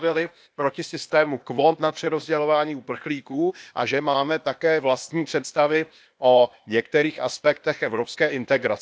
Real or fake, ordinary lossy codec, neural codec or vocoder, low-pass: fake; none; codec, 16 kHz, 0.8 kbps, ZipCodec; none